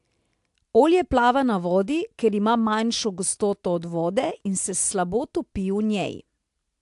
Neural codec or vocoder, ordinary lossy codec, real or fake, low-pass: none; AAC, 64 kbps; real; 10.8 kHz